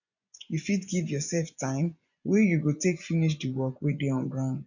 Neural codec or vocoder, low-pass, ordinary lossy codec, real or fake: vocoder, 24 kHz, 100 mel bands, Vocos; 7.2 kHz; none; fake